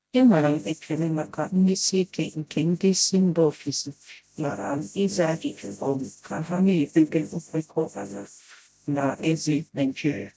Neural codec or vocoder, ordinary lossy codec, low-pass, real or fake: codec, 16 kHz, 0.5 kbps, FreqCodec, smaller model; none; none; fake